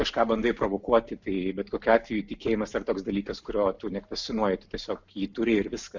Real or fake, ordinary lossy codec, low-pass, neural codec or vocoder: real; MP3, 64 kbps; 7.2 kHz; none